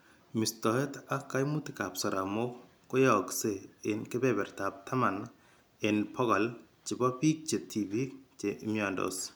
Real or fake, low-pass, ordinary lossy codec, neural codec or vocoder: real; none; none; none